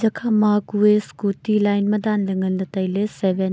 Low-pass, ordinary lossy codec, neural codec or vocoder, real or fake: none; none; none; real